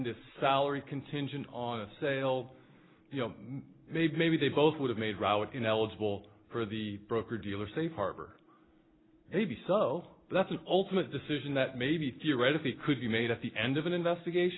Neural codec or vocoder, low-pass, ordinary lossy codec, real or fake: none; 7.2 kHz; AAC, 16 kbps; real